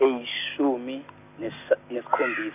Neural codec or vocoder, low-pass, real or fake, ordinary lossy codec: none; 3.6 kHz; real; none